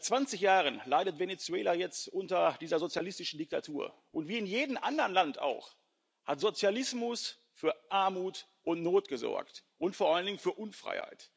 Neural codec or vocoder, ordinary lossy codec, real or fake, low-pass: none; none; real; none